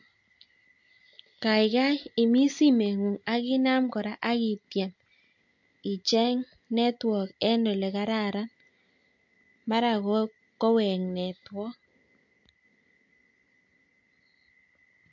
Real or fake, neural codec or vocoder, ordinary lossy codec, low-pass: real; none; MP3, 48 kbps; 7.2 kHz